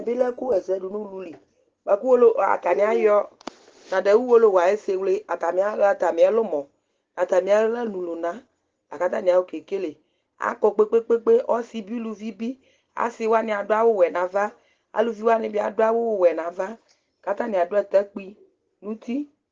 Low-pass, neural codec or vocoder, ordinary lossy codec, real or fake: 7.2 kHz; none; Opus, 16 kbps; real